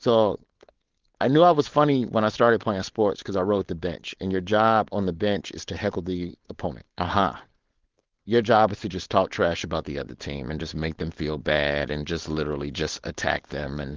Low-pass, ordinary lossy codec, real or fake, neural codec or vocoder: 7.2 kHz; Opus, 16 kbps; fake; codec, 16 kHz, 4.8 kbps, FACodec